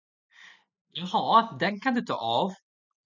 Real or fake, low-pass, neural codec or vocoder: real; 7.2 kHz; none